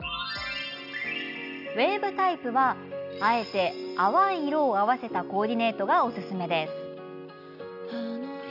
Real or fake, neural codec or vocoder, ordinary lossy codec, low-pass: real; none; none; 5.4 kHz